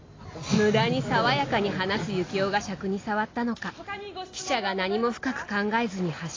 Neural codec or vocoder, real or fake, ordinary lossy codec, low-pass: none; real; AAC, 32 kbps; 7.2 kHz